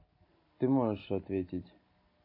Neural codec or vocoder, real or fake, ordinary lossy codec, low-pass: none; real; MP3, 32 kbps; 5.4 kHz